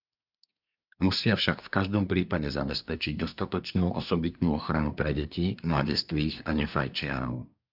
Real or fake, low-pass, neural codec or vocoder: fake; 5.4 kHz; codec, 24 kHz, 1 kbps, SNAC